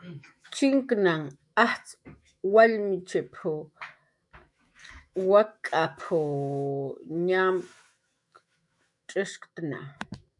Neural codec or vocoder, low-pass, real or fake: autoencoder, 48 kHz, 128 numbers a frame, DAC-VAE, trained on Japanese speech; 10.8 kHz; fake